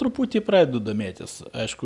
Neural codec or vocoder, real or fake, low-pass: none; real; 10.8 kHz